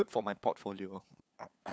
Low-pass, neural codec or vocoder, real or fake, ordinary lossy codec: none; codec, 16 kHz, 8 kbps, FunCodec, trained on LibriTTS, 25 frames a second; fake; none